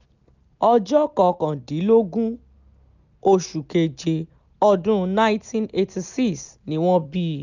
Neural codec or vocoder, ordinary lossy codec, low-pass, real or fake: none; none; 7.2 kHz; real